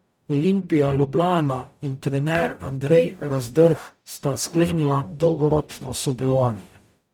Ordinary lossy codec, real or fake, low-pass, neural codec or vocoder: none; fake; 19.8 kHz; codec, 44.1 kHz, 0.9 kbps, DAC